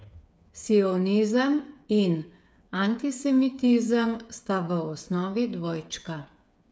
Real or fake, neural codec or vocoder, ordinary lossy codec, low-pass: fake; codec, 16 kHz, 8 kbps, FreqCodec, smaller model; none; none